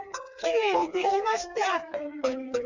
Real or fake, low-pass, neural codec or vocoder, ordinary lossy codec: fake; 7.2 kHz; codec, 16 kHz, 2 kbps, FreqCodec, smaller model; none